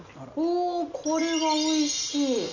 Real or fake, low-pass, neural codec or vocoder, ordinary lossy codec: real; 7.2 kHz; none; none